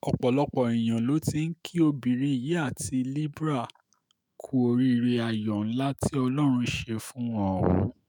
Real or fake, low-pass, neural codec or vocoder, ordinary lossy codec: fake; none; autoencoder, 48 kHz, 128 numbers a frame, DAC-VAE, trained on Japanese speech; none